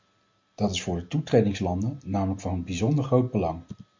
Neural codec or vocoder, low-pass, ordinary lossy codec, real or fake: none; 7.2 kHz; MP3, 48 kbps; real